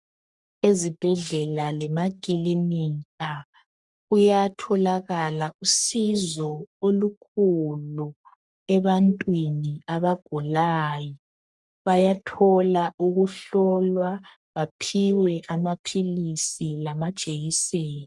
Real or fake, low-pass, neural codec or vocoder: fake; 10.8 kHz; codec, 44.1 kHz, 3.4 kbps, Pupu-Codec